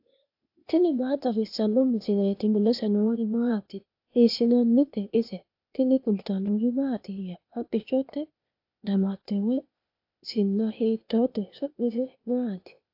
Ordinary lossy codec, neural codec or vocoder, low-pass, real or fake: AAC, 48 kbps; codec, 16 kHz, 0.8 kbps, ZipCodec; 5.4 kHz; fake